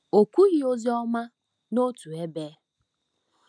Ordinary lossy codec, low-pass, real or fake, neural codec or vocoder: none; none; real; none